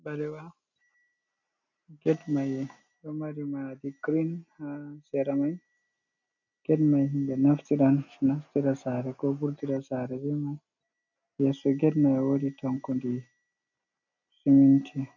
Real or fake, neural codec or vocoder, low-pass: real; none; 7.2 kHz